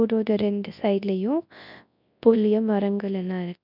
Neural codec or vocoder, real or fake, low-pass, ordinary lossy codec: codec, 24 kHz, 0.9 kbps, WavTokenizer, large speech release; fake; 5.4 kHz; none